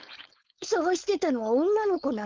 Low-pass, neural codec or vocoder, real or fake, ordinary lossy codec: 7.2 kHz; codec, 16 kHz, 4.8 kbps, FACodec; fake; Opus, 32 kbps